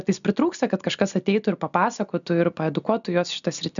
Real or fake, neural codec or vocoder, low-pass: real; none; 7.2 kHz